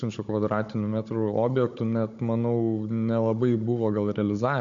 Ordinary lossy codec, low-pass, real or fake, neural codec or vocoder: MP3, 48 kbps; 7.2 kHz; fake; codec, 16 kHz, 16 kbps, FunCodec, trained on Chinese and English, 50 frames a second